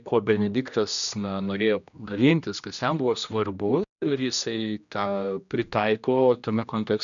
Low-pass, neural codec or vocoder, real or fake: 7.2 kHz; codec, 16 kHz, 1 kbps, X-Codec, HuBERT features, trained on general audio; fake